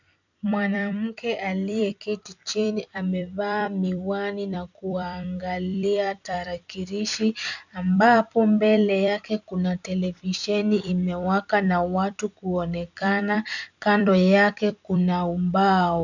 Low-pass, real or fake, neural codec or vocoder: 7.2 kHz; fake; vocoder, 44.1 kHz, 128 mel bands every 256 samples, BigVGAN v2